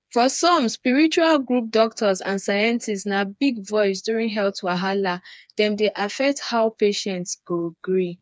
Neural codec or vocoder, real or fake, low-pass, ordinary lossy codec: codec, 16 kHz, 4 kbps, FreqCodec, smaller model; fake; none; none